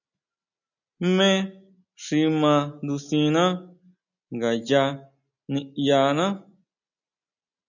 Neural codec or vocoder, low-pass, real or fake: none; 7.2 kHz; real